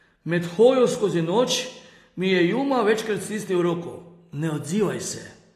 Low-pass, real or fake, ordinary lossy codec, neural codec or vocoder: 14.4 kHz; real; AAC, 48 kbps; none